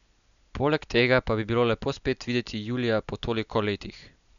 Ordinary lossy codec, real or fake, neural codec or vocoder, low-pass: none; real; none; 7.2 kHz